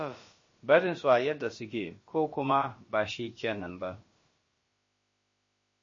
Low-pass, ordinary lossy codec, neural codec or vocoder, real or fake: 7.2 kHz; MP3, 32 kbps; codec, 16 kHz, about 1 kbps, DyCAST, with the encoder's durations; fake